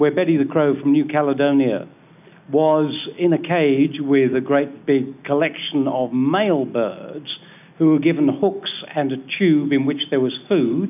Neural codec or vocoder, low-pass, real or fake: none; 3.6 kHz; real